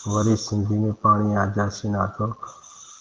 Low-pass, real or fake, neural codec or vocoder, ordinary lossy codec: 7.2 kHz; real; none; Opus, 16 kbps